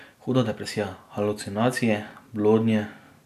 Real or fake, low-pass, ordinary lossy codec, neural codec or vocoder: real; 14.4 kHz; none; none